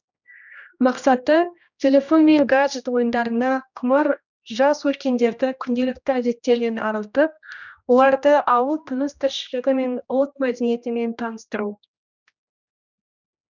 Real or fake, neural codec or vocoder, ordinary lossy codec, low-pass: fake; codec, 16 kHz, 1 kbps, X-Codec, HuBERT features, trained on general audio; none; 7.2 kHz